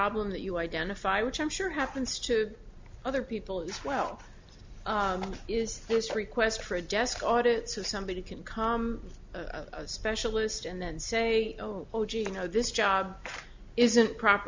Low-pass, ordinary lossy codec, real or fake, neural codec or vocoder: 7.2 kHz; MP3, 64 kbps; real; none